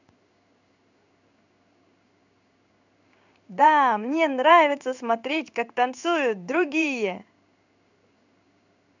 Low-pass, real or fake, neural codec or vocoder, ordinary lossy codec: 7.2 kHz; fake; codec, 16 kHz in and 24 kHz out, 1 kbps, XY-Tokenizer; none